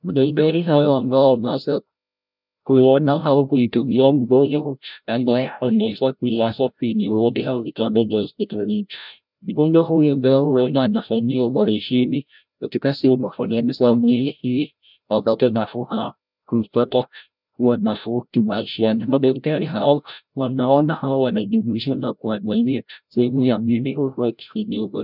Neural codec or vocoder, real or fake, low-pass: codec, 16 kHz, 0.5 kbps, FreqCodec, larger model; fake; 5.4 kHz